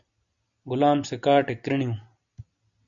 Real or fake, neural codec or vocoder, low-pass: real; none; 7.2 kHz